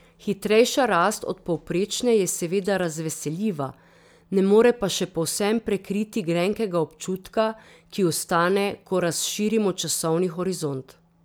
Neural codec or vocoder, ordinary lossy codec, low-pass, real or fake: none; none; none; real